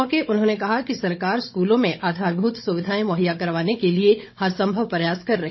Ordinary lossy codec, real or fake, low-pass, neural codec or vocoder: MP3, 24 kbps; fake; 7.2 kHz; codec, 16 kHz, 16 kbps, FunCodec, trained on Chinese and English, 50 frames a second